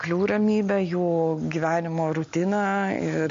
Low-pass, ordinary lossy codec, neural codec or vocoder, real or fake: 7.2 kHz; MP3, 48 kbps; none; real